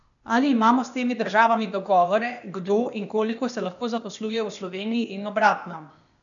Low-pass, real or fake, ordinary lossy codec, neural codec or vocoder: 7.2 kHz; fake; none; codec, 16 kHz, 0.8 kbps, ZipCodec